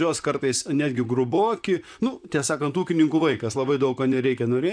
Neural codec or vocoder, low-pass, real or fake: vocoder, 44.1 kHz, 128 mel bands, Pupu-Vocoder; 9.9 kHz; fake